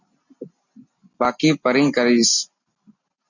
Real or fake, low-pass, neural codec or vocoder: real; 7.2 kHz; none